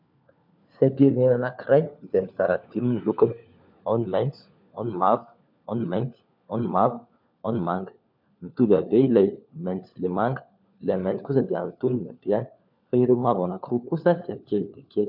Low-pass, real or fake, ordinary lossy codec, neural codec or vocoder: 5.4 kHz; fake; MP3, 48 kbps; codec, 16 kHz, 4 kbps, FunCodec, trained on LibriTTS, 50 frames a second